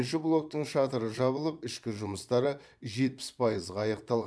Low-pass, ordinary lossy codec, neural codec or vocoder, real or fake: none; none; vocoder, 22.05 kHz, 80 mel bands, Vocos; fake